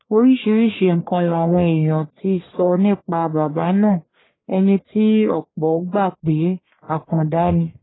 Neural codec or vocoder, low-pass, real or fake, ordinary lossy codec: codec, 44.1 kHz, 1.7 kbps, Pupu-Codec; 7.2 kHz; fake; AAC, 16 kbps